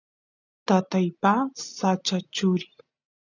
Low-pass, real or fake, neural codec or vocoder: 7.2 kHz; real; none